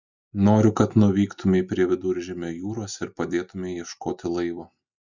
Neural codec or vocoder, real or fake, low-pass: none; real; 7.2 kHz